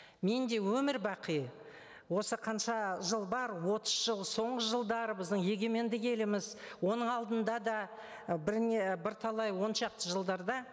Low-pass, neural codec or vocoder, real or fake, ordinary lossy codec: none; none; real; none